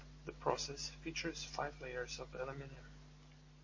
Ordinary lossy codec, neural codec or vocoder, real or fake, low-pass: MP3, 32 kbps; none; real; 7.2 kHz